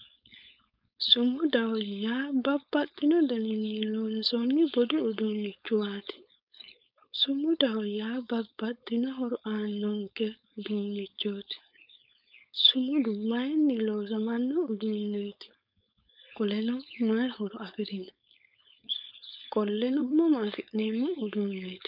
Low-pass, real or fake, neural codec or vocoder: 5.4 kHz; fake; codec, 16 kHz, 4.8 kbps, FACodec